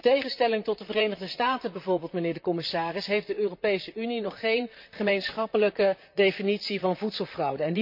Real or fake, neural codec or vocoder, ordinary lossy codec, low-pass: fake; vocoder, 44.1 kHz, 128 mel bands, Pupu-Vocoder; MP3, 48 kbps; 5.4 kHz